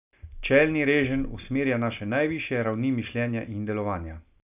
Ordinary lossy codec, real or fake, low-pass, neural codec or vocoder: none; real; 3.6 kHz; none